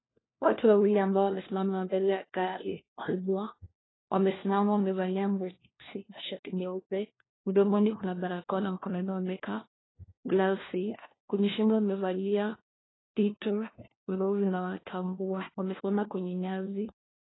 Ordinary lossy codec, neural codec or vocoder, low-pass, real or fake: AAC, 16 kbps; codec, 16 kHz, 1 kbps, FunCodec, trained on LibriTTS, 50 frames a second; 7.2 kHz; fake